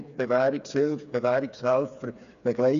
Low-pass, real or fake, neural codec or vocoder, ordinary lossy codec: 7.2 kHz; fake; codec, 16 kHz, 4 kbps, FreqCodec, smaller model; none